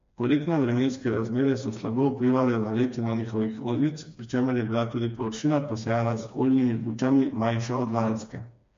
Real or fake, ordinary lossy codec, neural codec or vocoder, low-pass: fake; MP3, 48 kbps; codec, 16 kHz, 2 kbps, FreqCodec, smaller model; 7.2 kHz